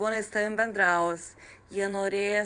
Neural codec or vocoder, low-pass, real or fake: vocoder, 22.05 kHz, 80 mel bands, WaveNeXt; 9.9 kHz; fake